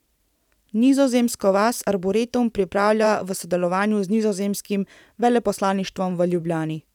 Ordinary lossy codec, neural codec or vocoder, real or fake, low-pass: none; vocoder, 44.1 kHz, 128 mel bands every 512 samples, BigVGAN v2; fake; 19.8 kHz